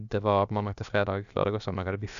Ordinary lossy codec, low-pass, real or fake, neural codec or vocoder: none; 7.2 kHz; fake; codec, 16 kHz, about 1 kbps, DyCAST, with the encoder's durations